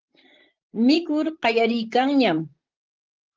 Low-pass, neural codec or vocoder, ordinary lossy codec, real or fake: 7.2 kHz; vocoder, 44.1 kHz, 128 mel bands, Pupu-Vocoder; Opus, 16 kbps; fake